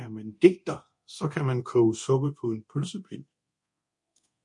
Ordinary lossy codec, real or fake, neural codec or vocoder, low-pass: MP3, 48 kbps; fake; codec, 24 kHz, 0.9 kbps, DualCodec; 10.8 kHz